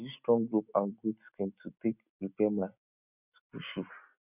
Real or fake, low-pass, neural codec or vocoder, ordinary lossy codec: real; 3.6 kHz; none; none